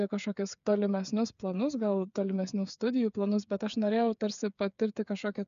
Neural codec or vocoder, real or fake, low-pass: codec, 16 kHz, 8 kbps, FreqCodec, smaller model; fake; 7.2 kHz